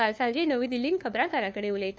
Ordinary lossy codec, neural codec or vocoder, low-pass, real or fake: none; codec, 16 kHz, 2 kbps, FunCodec, trained on LibriTTS, 25 frames a second; none; fake